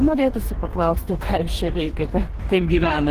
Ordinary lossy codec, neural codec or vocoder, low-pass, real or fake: Opus, 16 kbps; codec, 44.1 kHz, 2.6 kbps, DAC; 14.4 kHz; fake